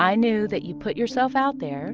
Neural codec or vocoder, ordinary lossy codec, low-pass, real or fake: none; Opus, 24 kbps; 7.2 kHz; real